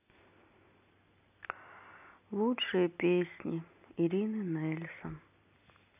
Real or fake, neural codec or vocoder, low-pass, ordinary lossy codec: real; none; 3.6 kHz; AAC, 32 kbps